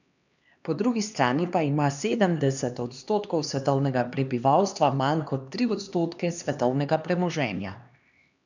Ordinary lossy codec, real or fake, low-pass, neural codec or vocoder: none; fake; 7.2 kHz; codec, 16 kHz, 2 kbps, X-Codec, HuBERT features, trained on LibriSpeech